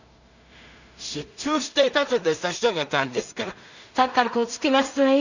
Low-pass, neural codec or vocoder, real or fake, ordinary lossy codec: 7.2 kHz; codec, 16 kHz in and 24 kHz out, 0.4 kbps, LongCat-Audio-Codec, two codebook decoder; fake; none